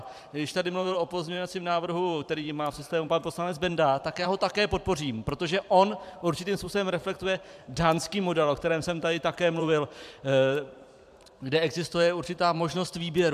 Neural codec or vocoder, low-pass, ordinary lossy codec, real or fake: vocoder, 44.1 kHz, 128 mel bands every 512 samples, BigVGAN v2; 14.4 kHz; MP3, 96 kbps; fake